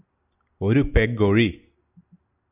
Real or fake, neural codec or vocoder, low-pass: real; none; 3.6 kHz